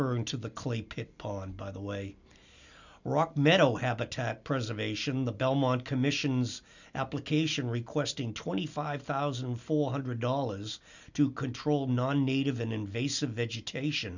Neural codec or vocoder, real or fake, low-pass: none; real; 7.2 kHz